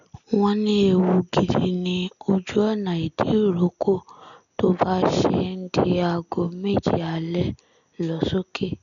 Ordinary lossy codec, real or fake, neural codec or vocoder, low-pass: none; real; none; 7.2 kHz